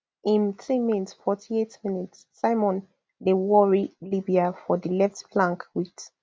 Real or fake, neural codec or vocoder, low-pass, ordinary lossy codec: real; none; none; none